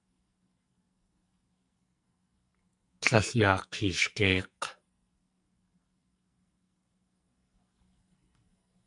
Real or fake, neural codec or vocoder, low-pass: fake; codec, 44.1 kHz, 2.6 kbps, SNAC; 10.8 kHz